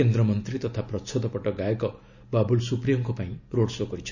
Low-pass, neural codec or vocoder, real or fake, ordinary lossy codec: 7.2 kHz; none; real; none